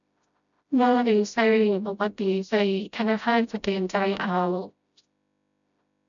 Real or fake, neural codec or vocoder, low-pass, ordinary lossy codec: fake; codec, 16 kHz, 0.5 kbps, FreqCodec, smaller model; 7.2 kHz; none